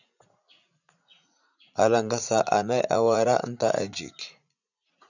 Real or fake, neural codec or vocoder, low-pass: fake; vocoder, 44.1 kHz, 80 mel bands, Vocos; 7.2 kHz